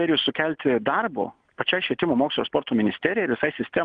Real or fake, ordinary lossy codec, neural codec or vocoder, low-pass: real; Opus, 24 kbps; none; 9.9 kHz